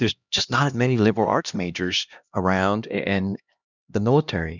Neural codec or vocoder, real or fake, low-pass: codec, 16 kHz, 1 kbps, X-Codec, HuBERT features, trained on LibriSpeech; fake; 7.2 kHz